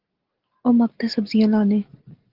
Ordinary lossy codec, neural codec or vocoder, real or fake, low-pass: Opus, 16 kbps; none; real; 5.4 kHz